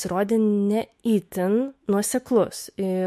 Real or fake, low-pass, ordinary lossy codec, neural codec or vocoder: fake; 14.4 kHz; MP3, 64 kbps; autoencoder, 48 kHz, 128 numbers a frame, DAC-VAE, trained on Japanese speech